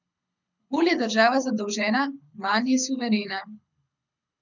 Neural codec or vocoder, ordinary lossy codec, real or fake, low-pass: codec, 24 kHz, 6 kbps, HILCodec; none; fake; 7.2 kHz